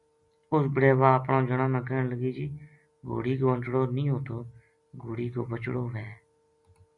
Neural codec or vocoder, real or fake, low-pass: none; real; 10.8 kHz